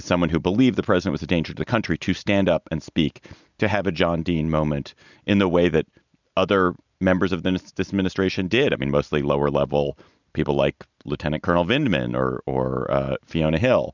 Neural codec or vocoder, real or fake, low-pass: none; real; 7.2 kHz